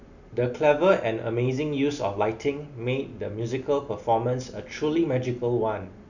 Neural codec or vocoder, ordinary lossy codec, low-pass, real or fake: none; none; 7.2 kHz; real